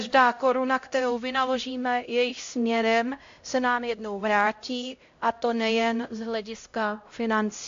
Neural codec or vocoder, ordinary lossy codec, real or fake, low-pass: codec, 16 kHz, 0.5 kbps, X-Codec, HuBERT features, trained on LibriSpeech; AAC, 48 kbps; fake; 7.2 kHz